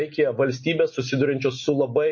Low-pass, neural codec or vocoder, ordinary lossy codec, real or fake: 7.2 kHz; none; MP3, 32 kbps; real